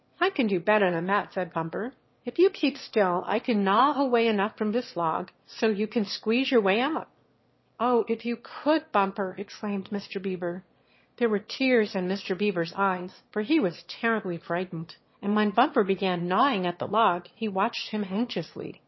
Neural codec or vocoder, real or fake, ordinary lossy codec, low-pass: autoencoder, 22.05 kHz, a latent of 192 numbers a frame, VITS, trained on one speaker; fake; MP3, 24 kbps; 7.2 kHz